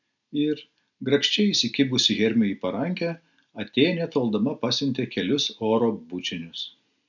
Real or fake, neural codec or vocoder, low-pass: real; none; 7.2 kHz